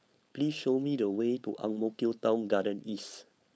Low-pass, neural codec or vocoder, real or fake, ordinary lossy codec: none; codec, 16 kHz, 4.8 kbps, FACodec; fake; none